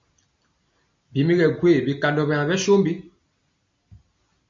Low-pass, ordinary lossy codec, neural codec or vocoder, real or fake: 7.2 kHz; MP3, 48 kbps; none; real